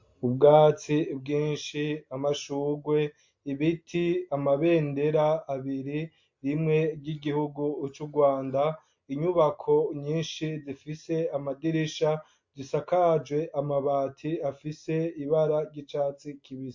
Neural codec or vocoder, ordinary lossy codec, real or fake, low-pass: none; MP3, 48 kbps; real; 7.2 kHz